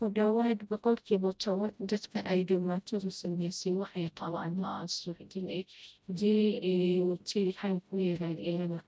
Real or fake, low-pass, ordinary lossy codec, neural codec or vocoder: fake; none; none; codec, 16 kHz, 0.5 kbps, FreqCodec, smaller model